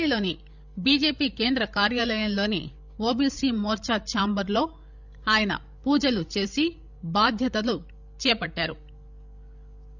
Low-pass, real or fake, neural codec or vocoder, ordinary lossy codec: 7.2 kHz; fake; codec, 16 kHz, 16 kbps, FreqCodec, larger model; none